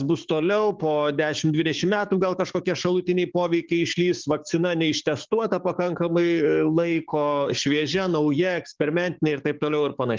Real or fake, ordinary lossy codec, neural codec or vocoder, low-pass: fake; Opus, 32 kbps; codec, 44.1 kHz, 7.8 kbps, DAC; 7.2 kHz